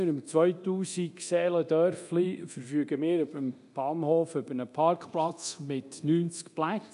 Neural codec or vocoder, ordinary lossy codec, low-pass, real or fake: codec, 24 kHz, 0.9 kbps, DualCodec; none; 10.8 kHz; fake